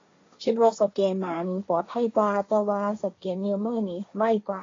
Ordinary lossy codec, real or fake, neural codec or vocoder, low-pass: AAC, 32 kbps; fake; codec, 16 kHz, 1.1 kbps, Voila-Tokenizer; 7.2 kHz